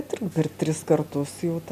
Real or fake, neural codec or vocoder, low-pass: real; none; 14.4 kHz